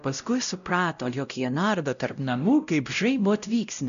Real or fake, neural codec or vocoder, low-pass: fake; codec, 16 kHz, 0.5 kbps, X-Codec, WavLM features, trained on Multilingual LibriSpeech; 7.2 kHz